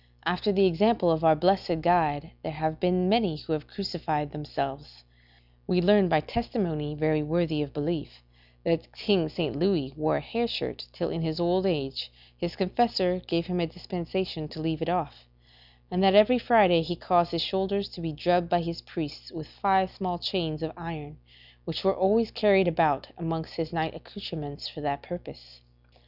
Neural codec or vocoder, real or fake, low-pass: none; real; 5.4 kHz